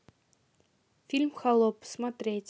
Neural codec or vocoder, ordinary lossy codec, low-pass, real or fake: none; none; none; real